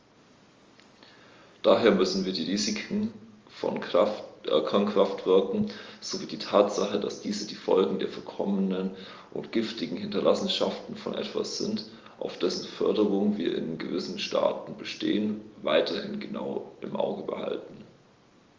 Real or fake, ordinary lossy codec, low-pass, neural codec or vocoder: real; Opus, 32 kbps; 7.2 kHz; none